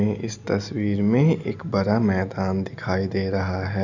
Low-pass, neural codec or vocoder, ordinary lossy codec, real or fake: 7.2 kHz; none; none; real